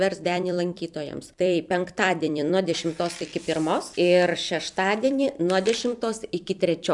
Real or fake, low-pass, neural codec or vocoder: fake; 10.8 kHz; vocoder, 44.1 kHz, 128 mel bands every 256 samples, BigVGAN v2